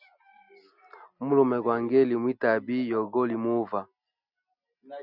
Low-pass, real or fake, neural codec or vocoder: 5.4 kHz; real; none